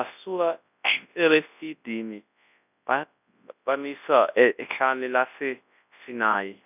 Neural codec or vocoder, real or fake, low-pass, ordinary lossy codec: codec, 24 kHz, 0.9 kbps, WavTokenizer, large speech release; fake; 3.6 kHz; none